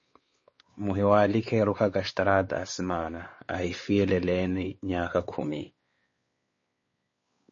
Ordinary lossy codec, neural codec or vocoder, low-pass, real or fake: MP3, 32 kbps; codec, 16 kHz, 4 kbps, X-Codec, WavLM features, trained on Multilingual LibriSpeech; 7.2 kHz; fake